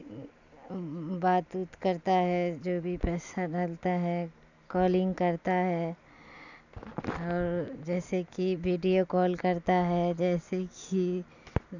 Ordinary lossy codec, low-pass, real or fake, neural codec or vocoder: none; 7.2 kHz; real; none